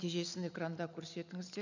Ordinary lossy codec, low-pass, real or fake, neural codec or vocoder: none; 7.2 kHz; real; none